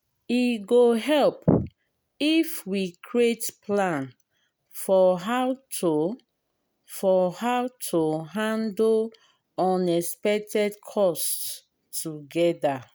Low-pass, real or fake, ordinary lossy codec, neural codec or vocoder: none; real; none; none